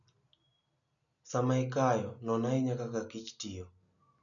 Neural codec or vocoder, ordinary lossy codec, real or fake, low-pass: none; none; real; 7.2 kHz